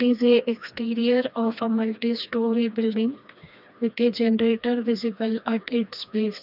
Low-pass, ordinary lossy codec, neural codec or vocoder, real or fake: 5.4 kHz; none; codec, 16 kHz, 2 kbps, FreqCodec, smaller model; fake